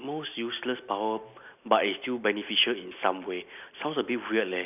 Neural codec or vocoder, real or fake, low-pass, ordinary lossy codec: none; real; 3.6 kHz; none